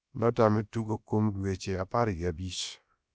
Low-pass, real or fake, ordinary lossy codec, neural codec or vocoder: none; fake; none; codec, 16 kHz, about 1 kbps, DyCAST, with the encoder's durations